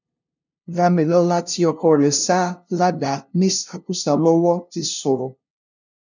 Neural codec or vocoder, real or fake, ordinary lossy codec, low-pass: codec, 16 kHz, 0.5 kbps, FunCodec, trained on LibriTTS, 25 frames a second; fake; none; 7.2 kHz